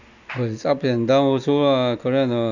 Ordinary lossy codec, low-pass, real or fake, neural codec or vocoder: none; 7.2 kHz; real; none